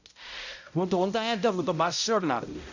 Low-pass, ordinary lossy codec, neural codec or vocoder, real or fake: 7.2 kHz; none; codec, 16 kHz, 0.5 kbps, X-Codec, HuBERT features, trained on balanced general audio; fake